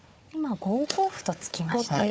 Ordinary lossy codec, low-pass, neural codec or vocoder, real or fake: none; none; codec, 16 kHz, 4 kbps, FunCodec, trained on Chinese and English, 50 frames a second; fake